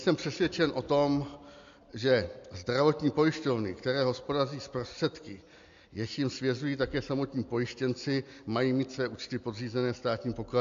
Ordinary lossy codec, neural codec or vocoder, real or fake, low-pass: AAC, 64 kbps; none; real; 7.2 kHz